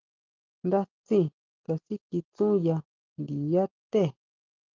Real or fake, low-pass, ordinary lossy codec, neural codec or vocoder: real; 7.2 kHz; Opus, 32 kbps; none